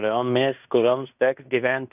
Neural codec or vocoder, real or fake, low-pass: codec, 16 kHz in and 24 kHz out, 0.9 kbps, LongCat-Audio-Codec, fine tuned four codebook decoder; fake; 3.6 kHz